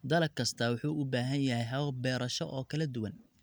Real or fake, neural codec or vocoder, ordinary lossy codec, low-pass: real; none; none; none